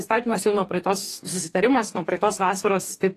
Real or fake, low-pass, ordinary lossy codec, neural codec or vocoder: fake; 14.4 kHz; AAC, 48 kbps; codec, 44.1 kHz, 2.6 kbps, DAC